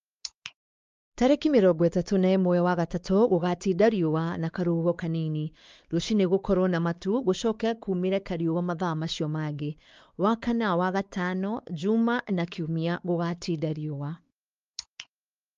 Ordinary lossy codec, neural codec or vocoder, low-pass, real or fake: Opus, 32 kbps; codec, 16 kHz, 4 kbps, X-Codec, WavLM features, trained on Multilingual LibriSpeech; 7.2 kHz; fake